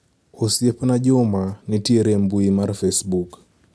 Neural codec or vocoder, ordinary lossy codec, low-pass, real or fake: none; none; none; real